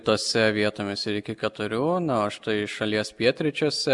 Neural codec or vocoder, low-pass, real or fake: none; 10.8 kHz; real